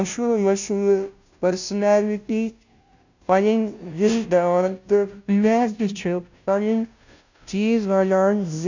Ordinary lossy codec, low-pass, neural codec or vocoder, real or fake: none; 7.2 kHz; codec, 16 kHz, 0.5 kbps, FunCodec, trained on Chinese and English, 25 frames a second; fake